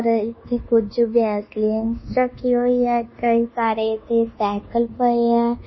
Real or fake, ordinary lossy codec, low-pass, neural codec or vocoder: fake; MP3, 24 kbps; 7.2 kHz; codec, 24 kHz, 1.2 kbps, DualCodec